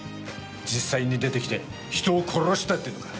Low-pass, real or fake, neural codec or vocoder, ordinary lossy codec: none; real; none; none